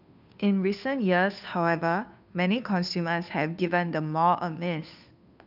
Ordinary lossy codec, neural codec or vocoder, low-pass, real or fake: none; codec, 16 kHz, 2 kbps, FunCodec, trained on Chinese and English, 25 frames a second; 5.4 kHz; fake